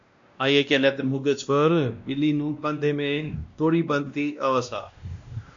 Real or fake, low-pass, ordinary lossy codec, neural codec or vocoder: fake; 7.2 kHz; MP3, 64 kbps; codec, 16 kHz, 1 kbps, X-Codec, WavLM features, trained on Multilingual LibriSpeech